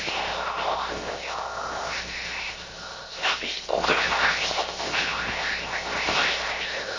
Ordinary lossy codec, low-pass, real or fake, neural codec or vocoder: MP3, 32 kbps; 7.2 kHz; fake; codec, 16 kHz, 0.7 kbps, FocalCodec